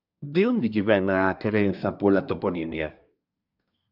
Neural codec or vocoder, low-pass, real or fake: codec, 24 kHz, 1 kbps, SNAC; 5.4 kHz; fake